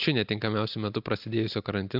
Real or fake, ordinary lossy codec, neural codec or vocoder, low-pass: real; AAC, 48 kbps; none; 5.4 kHz